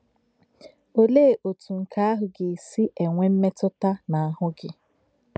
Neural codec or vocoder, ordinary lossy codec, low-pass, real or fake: none; none; none; real